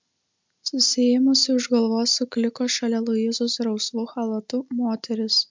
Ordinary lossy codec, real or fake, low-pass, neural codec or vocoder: MP3, 64 kbps; real; 7.2 kHz; none